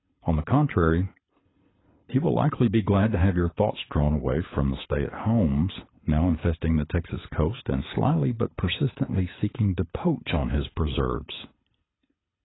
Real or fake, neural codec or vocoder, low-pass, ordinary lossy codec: fake; codec, 24 kHz, 6 kbps, HILCodec; 7.2 kHz; AAC, 16 kbps